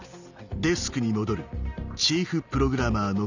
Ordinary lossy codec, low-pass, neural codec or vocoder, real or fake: none; 7.2 kHz; none; real